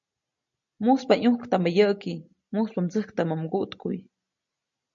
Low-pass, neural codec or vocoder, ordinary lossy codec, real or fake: 7.2 kHz; none; MP3, 48 kbps; real